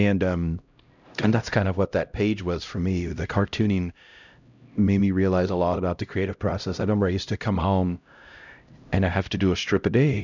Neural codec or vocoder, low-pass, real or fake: codec, 16 kHz, 0.5 kbps, X-Codec, HuBERT features, trained on LibriSpeech; 7.2 kHz; fake